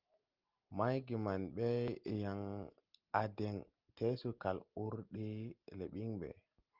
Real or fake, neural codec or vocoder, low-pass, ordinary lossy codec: real; none; 5.4 kHz; Opus, 24 kbps